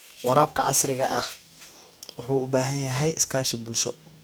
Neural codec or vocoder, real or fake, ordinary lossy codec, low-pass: codec, 44.1 kHz, 2.6 kbps, DAC; fake; none; none